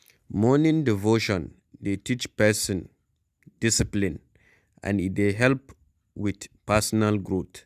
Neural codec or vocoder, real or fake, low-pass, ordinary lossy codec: none; real; 14.4 kHz; none